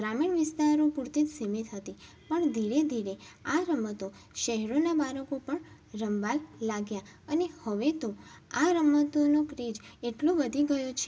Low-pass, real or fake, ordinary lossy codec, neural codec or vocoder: none; real; none; none